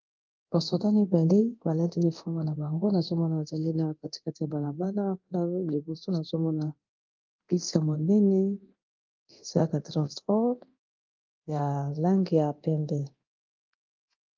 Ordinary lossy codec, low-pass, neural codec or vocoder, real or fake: Opus, 24 kbps; 7.2 kHz; codec, 24 kHz, 0.9 kbps, DualCodec; fake